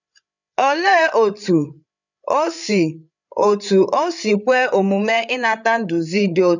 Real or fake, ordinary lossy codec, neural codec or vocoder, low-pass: fake; none; codec, 16 kHz, 8 kbps, FreqCodec, larger model; 7.2 kHz